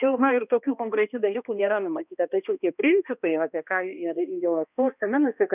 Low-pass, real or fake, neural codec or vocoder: 3.6 kHz; fake; codec, 16 kHz, 1 kbps, X-Codec, HuBERT features, trained on balanced general audio